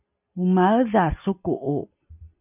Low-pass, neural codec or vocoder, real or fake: 3.6 kHz; none; real